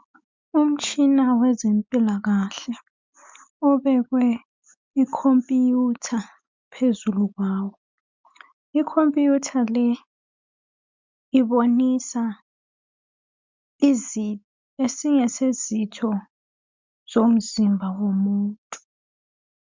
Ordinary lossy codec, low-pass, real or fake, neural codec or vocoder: MP3, 64 kbps; 7.2 kHz; real; none